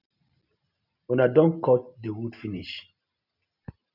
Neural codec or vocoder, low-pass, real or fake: none; 5.4 kHz; real